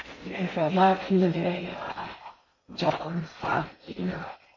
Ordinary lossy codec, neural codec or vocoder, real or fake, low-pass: MP3, 32 kbps; codec, 16 kHz in and 24 kHz out, 0.6 kbps, FocalCodec, streaming, 2048 codes; fake; 7.2 kHz